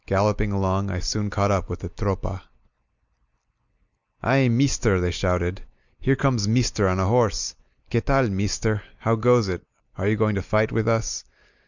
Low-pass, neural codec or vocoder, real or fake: 7.2 kHz; none; real